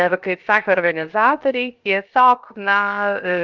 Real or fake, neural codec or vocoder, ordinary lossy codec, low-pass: fake; codec, 16 kHz, 0.7 kbps, FocalCodec; Opus, 24 kbps; 7.2 kHz